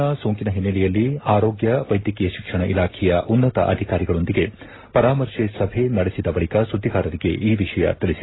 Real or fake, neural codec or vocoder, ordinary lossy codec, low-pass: real; none; AAC, 16 kbps; 7.2 kHz